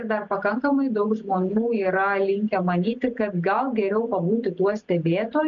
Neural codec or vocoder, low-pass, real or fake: none; 7.2 kHz; real